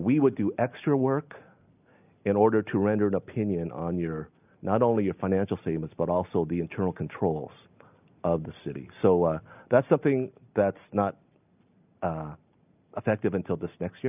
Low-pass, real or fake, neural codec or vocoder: 3.6 kHz; real; none